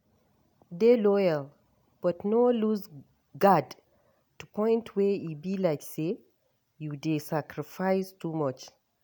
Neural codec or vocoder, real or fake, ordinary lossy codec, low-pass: none; real; none; none